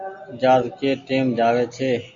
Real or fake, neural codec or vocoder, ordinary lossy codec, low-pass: real; none; AAC, 64 kbps; 7.2 kHz